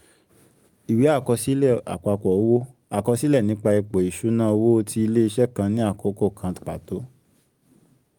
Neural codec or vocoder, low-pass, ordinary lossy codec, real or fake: none; 19.8 kHz; Opus, 32 kbps; real